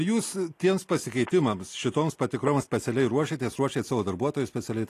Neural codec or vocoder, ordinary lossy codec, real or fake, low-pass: none; AAC, 48 kbps; real; 14.4 kHz